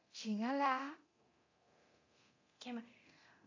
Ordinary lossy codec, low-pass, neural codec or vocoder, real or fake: AAC, 32 kbps; 7.2 kHz; codec, 24 kHz, 0.9 kbps, DualCodec; fake